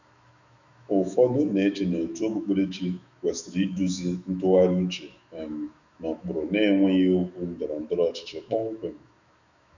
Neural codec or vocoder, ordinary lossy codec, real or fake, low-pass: autoencoder, 48 kHz, 128 numbers a frame, DAC-VAE, trained on Japanese speech; none; fake; 7.2 kHz